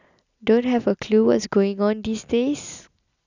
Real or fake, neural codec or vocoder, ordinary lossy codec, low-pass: real; none; none; 7.2 kHz